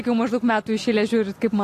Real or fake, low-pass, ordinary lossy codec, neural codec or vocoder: real; 14.4 kHz; AAC, 48 kbps; none